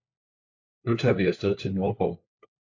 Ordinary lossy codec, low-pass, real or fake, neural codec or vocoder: AAC, 48 kbps; 7.2 kHz; fake; codec, 16 kHz, 4 kbps, FunCodec, trained on LibriTTS, 50 frames a second